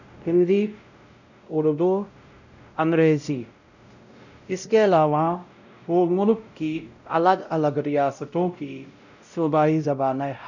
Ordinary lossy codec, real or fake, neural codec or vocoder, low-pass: none; fake; codec, 16 kHz, 0.5 kbps, X-Codec, WavLM features, trained on Multilingual LibriSpeech; 7.2 kHz